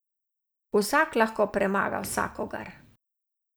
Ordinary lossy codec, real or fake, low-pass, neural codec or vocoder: none; real; none; none